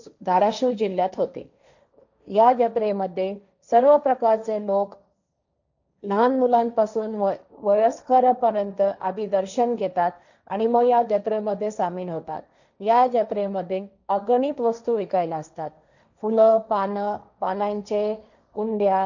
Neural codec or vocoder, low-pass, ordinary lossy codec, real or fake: codec, 16 kHz, 1.1 kbps, Voila-Tokenizer; 7.2 kHz; none; fake